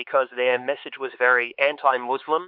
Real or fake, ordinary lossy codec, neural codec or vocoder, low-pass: fake; MP3, 48 kbps; codec, 16 kHz, 4 kbps, X-Codec, HuBERT features, trained on LibriSpeech; 5.4 kHz